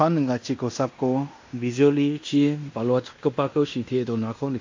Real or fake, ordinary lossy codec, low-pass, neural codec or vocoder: fake; AAC, 48 kbps; 7.2 kHz; codec, 16 kHz in and 24 kHz out, 0.9 kbps, LongCat-Audio-Codec, fine tuned four codebook decoder